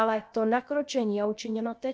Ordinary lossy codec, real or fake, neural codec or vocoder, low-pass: none; fake; codec, 16 kHz, about 1 kbps, DyCAST, with the encoder's durations; none